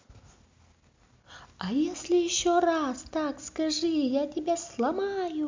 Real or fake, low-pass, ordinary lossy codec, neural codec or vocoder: real; 7.2 kHz; MP3, 64 kbps; none